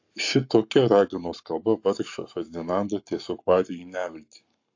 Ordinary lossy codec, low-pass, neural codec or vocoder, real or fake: AAC, 48 kbps; 7.2 kHz; codec, 44.1 kHz, 7.8 kbps, Pupu-Codec; fake